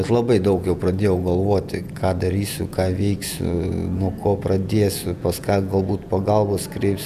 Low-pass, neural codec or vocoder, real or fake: 14.4 kHz; vocoder, 48 kHz, 128 mel bands, Vocos; fake